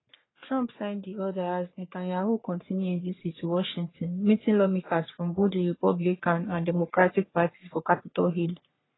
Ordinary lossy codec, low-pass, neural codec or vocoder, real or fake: AAC, 16 kbps; 7.2 kHz; codec, 44.1 kHz, 3.4 kbps, Pupu-Codec; fake